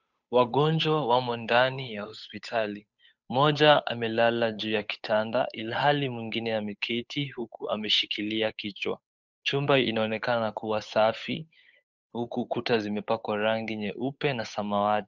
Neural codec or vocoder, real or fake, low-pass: codec, 16 kHz, 8 kbps, FunCodec, trained on Chinese and English, 25 frames a second; fake; 7.2 kHz